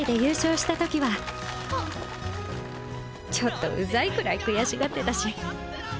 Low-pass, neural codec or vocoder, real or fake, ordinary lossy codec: none; none; real; none